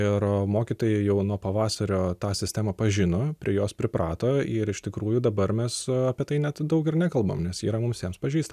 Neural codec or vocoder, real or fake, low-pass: none; real; 14.4 kHz